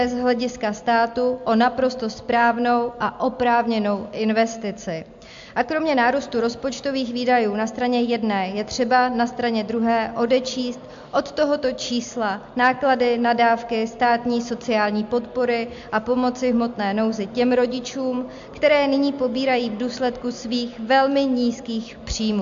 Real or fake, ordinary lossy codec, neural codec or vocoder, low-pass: real; AAC, 64 kbps; none; 7.2 kHz